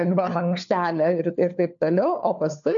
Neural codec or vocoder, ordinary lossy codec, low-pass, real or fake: codec, 16 kHz, 4 kbps, FunCodec, trained on LibriTTS, 50 frames a second; MP3, 64 kbps; 7.2 kHz; fake